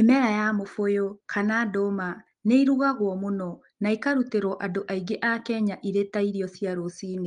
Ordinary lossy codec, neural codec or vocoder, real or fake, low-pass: Opus, 24 kbps; none; real; 9.9 kHz